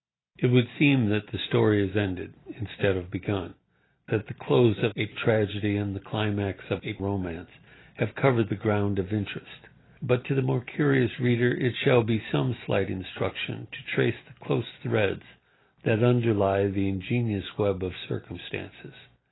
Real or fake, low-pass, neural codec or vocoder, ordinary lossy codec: real; 7.2 kHz; none; AAC, 16 kbps